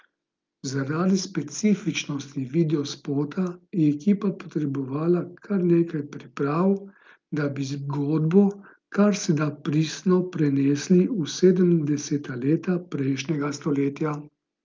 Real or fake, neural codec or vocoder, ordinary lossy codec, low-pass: real; none; Opus, 24 kbps; 7.2 kHz